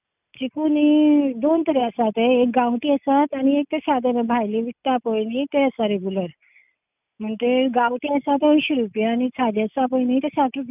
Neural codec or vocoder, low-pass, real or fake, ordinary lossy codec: none; 3.6 kHz; real; none